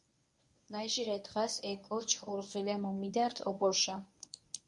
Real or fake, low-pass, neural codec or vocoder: fake; 10.8 kHz; codec, 24 kHz, 0.9 kbps, WavTokenizer, medium speech release version 1